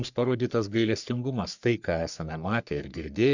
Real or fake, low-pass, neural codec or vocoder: fake; 7.2 kHz; codec, 44.1 kHz, 3.4 kbps, Pupu-Codec